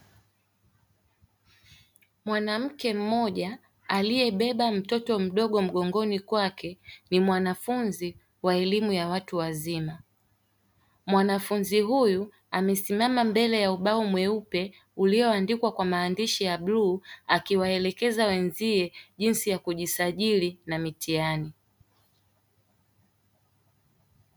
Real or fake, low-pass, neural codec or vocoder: real; 19.8 kHz; none